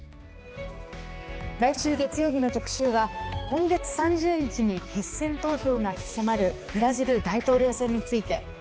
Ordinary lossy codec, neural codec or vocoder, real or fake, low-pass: none; codec, 16 kHz, 2 kbps, X-Codec, HuBERT features, trained on balanced general audio; fake; none